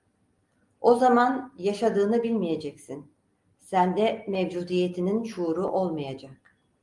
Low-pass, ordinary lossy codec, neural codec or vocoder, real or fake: 10.8 kHz; Opus, 32 kbps; none; real